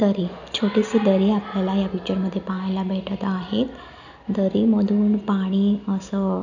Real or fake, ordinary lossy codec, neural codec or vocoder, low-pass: real; none; none; 7.2 kHz